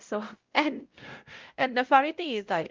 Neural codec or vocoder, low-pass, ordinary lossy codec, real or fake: codec, 16 kHz, 0.5 kbps, X-Codec, WavLM features, trained on Multilingual LibriSpeech; 7.2 kHz; Opus, 32 kbps; fake